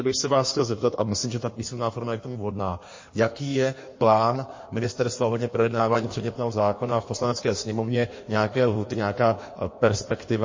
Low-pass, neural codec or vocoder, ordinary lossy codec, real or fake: 7.2 kHz; codec, 16 kHz in and 24 kHz out, 1.1 kbps, FireRedTTS-2 codec; MP3, 32 kbps; fake